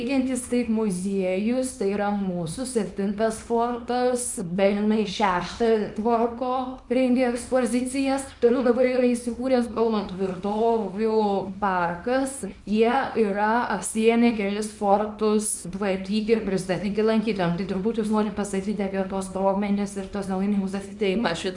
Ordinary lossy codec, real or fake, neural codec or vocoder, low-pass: MP3, 96 kbps; fake; codec, 24 kHz, 0.9 kbps, WavTokenizer, small release; 10.8 kHz